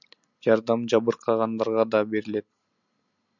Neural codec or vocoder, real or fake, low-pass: none; real; 7.2 kHz